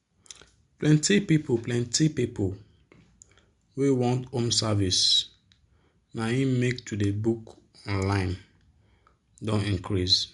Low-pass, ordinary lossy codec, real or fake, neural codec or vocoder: 10.8 kHz; MP3, 64 kbps; real; none